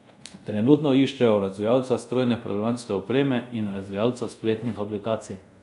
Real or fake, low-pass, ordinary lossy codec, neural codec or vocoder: fake; 10.8 kHz; none; codec, 24 kHz, 0.5 kbps, DualCodec